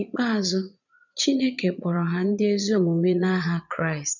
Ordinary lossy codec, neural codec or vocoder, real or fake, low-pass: none; vocoder, 22.05 kHz, 80 mel bands, Vocos; fake; 7.2 kHz